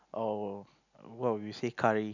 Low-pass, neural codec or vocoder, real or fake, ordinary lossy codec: 7.2 kHz; none; real; none